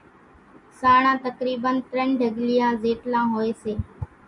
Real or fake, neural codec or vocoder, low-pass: real; none; 10.8 kHz